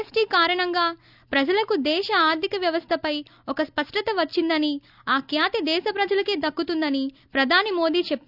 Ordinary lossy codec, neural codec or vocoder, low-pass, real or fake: none; none; 5.4 kHz; real